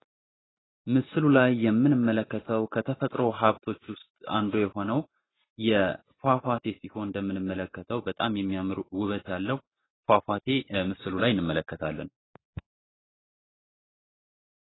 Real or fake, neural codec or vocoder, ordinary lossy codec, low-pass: real; none; AAC, 16 kbps; 7.2 kHz